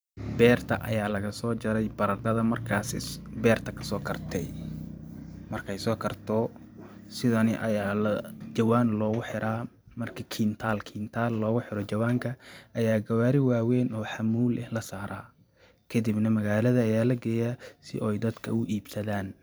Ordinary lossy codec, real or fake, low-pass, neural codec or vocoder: none; fake; none; vocoder, 44.1 kHz, 128 mel bands every 512 samples, BigVGAN v2